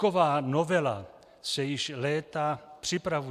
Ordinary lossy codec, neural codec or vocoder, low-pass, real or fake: AAC, 96 kbps; none; 14.4 kHz; real